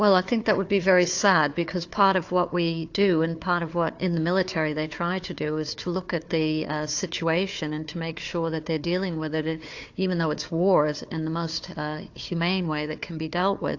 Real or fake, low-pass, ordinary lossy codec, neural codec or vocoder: fake; 7.2 kHz; AAC, 48 kbps; codec, 16 kHz, 4 kbps, FunCodec, trained on Chinese and English, 50 frames a second